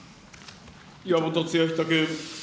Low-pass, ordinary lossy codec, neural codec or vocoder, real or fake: none; none; none; real